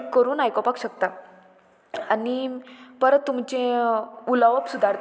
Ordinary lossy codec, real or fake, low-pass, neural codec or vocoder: none; real; none; none